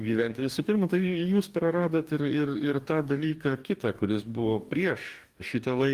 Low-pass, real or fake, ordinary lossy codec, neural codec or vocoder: 14.4 kHz; fake; Opus, 32 kbps; codec, 44.1 kHz, 2.6 kbps, DAC